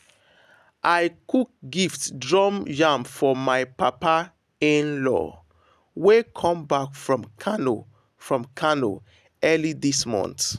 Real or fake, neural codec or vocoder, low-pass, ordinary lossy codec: real; none; 14.4 kHz; none